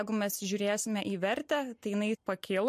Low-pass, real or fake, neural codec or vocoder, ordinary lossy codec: 14.4 kHz; real; none; MP3, 64 kbps